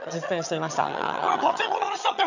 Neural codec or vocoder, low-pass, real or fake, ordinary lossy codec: vocoder, 22.05 kHz, 80 mel bands, HiFi-GAN; 7.2 kHz; fake; none